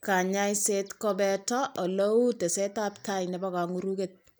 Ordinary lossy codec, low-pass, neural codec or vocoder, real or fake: none; none; none; real